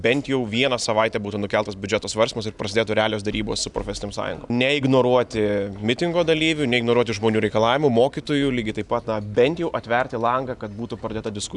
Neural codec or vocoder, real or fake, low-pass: none; real; 10.8 kHz